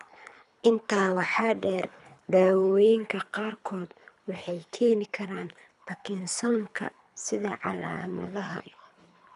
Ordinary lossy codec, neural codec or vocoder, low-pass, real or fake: none; codec, 24 kHz, 3 kbps, HILCodec; 10.8 kHz; fake